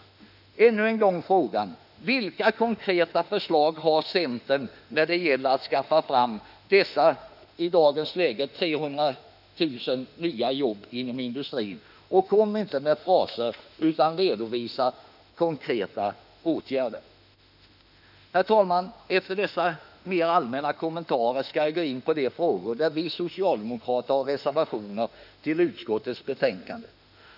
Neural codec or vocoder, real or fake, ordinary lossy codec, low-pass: autoencoder, 48 kHz, 32 numbers a frame, DAC-VAE, trained on Japanese speech; fake; none; 5.4 kHz